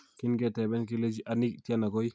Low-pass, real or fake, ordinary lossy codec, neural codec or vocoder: none; real; none; none